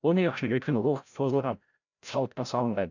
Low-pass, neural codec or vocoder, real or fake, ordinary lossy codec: 7.2 kHz; codec, 16 kHz, 0.5 kbps, FreqCodec, larger model; fake; none